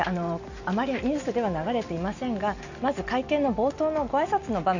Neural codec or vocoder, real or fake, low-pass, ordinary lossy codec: none; real; 7.2 kHz; none